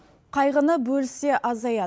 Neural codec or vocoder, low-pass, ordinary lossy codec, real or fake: none; none; none; real